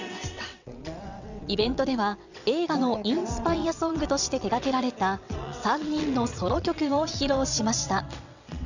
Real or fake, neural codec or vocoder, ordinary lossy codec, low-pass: fake; vocoder, 22.05 kHz, 80 mel bands, WaveNeXt; none; 7.2 kHz